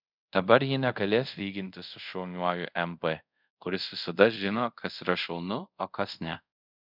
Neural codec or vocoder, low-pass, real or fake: codec, 24 kHz, 0.5 kbps, DualCodec; 5.4 kHz; fake